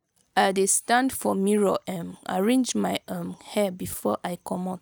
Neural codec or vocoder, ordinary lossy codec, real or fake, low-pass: none; none; real; none